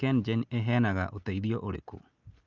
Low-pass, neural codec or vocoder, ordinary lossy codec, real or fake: 7.2 kHz; none; Opus, 24 kbps; real